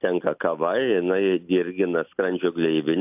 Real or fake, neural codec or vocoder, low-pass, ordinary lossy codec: real; none; 3.6 kHz; AAC, 32 kbps